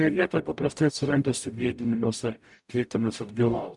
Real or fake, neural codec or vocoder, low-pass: fake; codec, 44.1 kHz, 0.9 kbps, DAC; 10.8 kHz